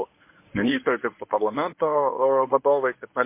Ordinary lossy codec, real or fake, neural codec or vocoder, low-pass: MP3, 24 kbps; fake; codec, 24 kHz, 0.9 kbps, WavTokenizer, medium speech release version 1; 3.6 kHz